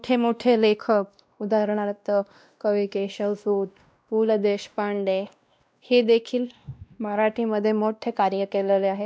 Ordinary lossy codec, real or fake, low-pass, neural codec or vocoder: none; fake; none; codec, 16 kHz, 1 kbps, X-Codec, WavLM features, trained on Multilingual LibriSpeech